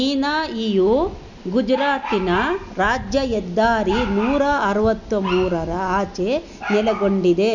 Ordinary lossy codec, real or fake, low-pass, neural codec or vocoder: none; real; 7.2 kHz; none